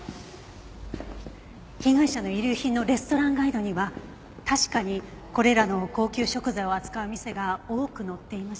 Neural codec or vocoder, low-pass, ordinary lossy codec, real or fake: none; none; none; real